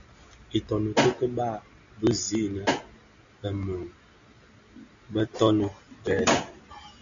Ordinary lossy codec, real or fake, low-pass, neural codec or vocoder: MP3, 64 kbps; real; 7.2 kHz; none